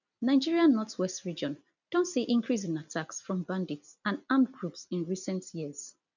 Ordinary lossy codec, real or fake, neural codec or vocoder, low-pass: none; real; none; 7.2 kHz